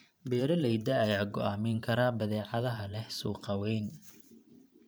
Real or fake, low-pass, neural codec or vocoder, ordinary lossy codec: fake; none; vocoder, 44.1 kHz, 128 mel bands every 512 samples, BigVGAN v2; none